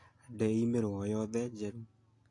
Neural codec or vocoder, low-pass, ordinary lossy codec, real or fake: none; 10.8 kHz; AAC, 48 kbps; real